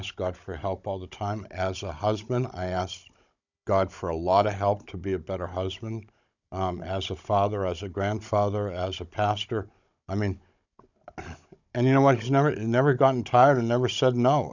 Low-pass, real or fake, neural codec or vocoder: 7.2 kHz; fake; codec, 16 kHz, 16 kbps, FunCodec, trained on Chinese and English, 50 frames a second